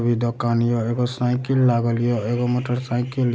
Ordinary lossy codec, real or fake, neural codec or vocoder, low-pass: none; real; none; none